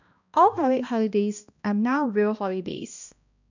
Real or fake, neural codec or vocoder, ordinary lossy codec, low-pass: fake; codec, 16 kHz, 1 kbps, X-Codec, HuBERT features, trained on balanced general audio; none; 7.2 kHz